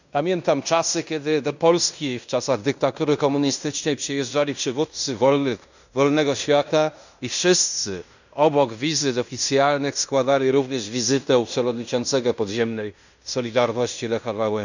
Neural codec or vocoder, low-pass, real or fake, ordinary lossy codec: codec, 16 kHz in and 24 kHz out, 0.9 kbps, LongCat-Audio-Codec, fine tuned four codebook decoder; 7.2 kHz; fake; none